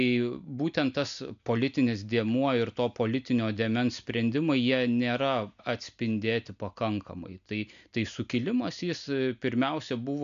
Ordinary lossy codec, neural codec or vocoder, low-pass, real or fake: AAC, 96 kbps; none; 7.2 kHz; real